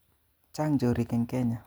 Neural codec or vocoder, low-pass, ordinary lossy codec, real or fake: none; none; none; real